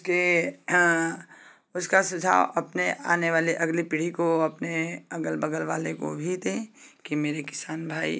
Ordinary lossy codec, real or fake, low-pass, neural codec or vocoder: none; real; none; none